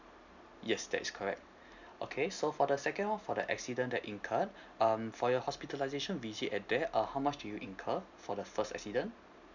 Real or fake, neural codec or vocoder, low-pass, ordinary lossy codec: real; none; 7.2 kHz; none